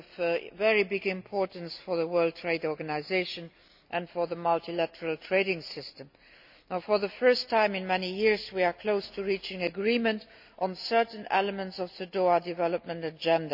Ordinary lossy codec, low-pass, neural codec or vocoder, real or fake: none; 5.4 kHz; none; real